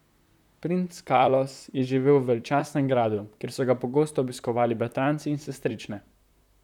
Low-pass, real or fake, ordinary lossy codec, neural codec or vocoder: 19.8 kHz; fake; none; vocoder, 44.1 kHz, 128 mel bands, Pupu-Vocoder